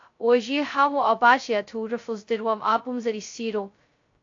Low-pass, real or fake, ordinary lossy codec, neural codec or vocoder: 7.2 kHz; fake; AAC, 48 kbps; codec, 16 kHz, 0.2 kbps, FocalCodec